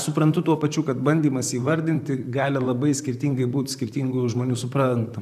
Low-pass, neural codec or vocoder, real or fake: 14.4 kHz; vocoder, 44.1 kHz, 128 mel bands, Pupu-Vocoder; fake